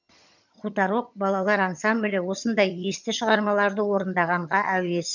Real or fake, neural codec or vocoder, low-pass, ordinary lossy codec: fake; vocoder, 22.05 kHz, 80 mel bands, HiFi-GAN; 7.2 kHz; none